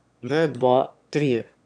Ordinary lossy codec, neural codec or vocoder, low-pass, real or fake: none; autoencoder, 22.05 kHz, a latent of 192 numbers a frame, VITS, trained on one speaker; 9.9 kHz; fake